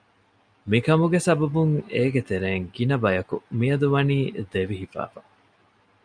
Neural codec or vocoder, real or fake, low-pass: none; real; 9.9 kHz